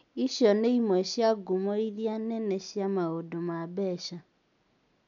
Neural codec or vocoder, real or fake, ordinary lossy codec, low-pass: none; real; none; 7.2 kHz